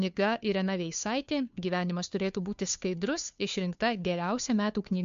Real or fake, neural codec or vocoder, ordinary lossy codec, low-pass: fake; codec, 16 kHz, 2 kbps, FunCodec, trained on Chinese and English, 25 frames a second; MP3, 64 kbps; 7.2 kHz